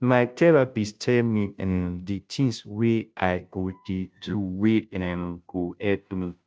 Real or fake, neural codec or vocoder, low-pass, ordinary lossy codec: fake; codec, 16 kHz, 0.5 kbps, FunCodec, trained on Chinese and English, 25 frames a second; none; none